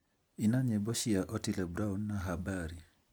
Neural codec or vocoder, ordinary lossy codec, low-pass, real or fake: none; none; none; real